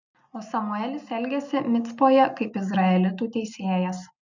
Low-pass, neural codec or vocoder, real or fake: 7.2 kHz; none; real